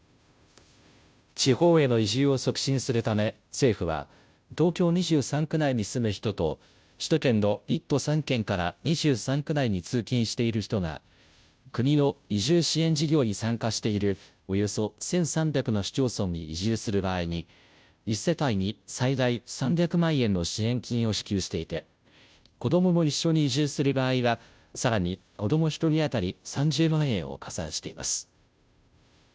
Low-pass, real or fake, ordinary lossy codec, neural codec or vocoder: none; fake; none; codec, 16 kHz, 0.5 kbps, FunCodec, trained on Chinese and English, 25 frames a second